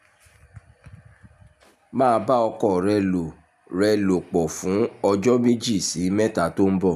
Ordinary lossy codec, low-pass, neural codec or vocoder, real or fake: none; 14.4 kHz; none; real